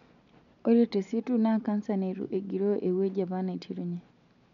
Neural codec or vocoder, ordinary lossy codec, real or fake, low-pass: none; none; real; 7.2 kHz